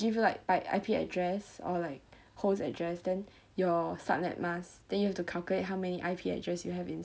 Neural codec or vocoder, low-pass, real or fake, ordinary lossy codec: none; none; real; none